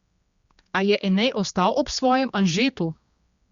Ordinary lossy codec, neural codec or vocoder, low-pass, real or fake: Opus, 64 kbps; codec, 16 kHz, 2 kbps, X-Codec, HuBERT features, trained on general audio; 7.2 kHz; fake